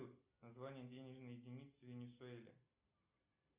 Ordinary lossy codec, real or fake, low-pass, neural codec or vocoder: Opus, 64 kbps; real; 3.6 kHz; none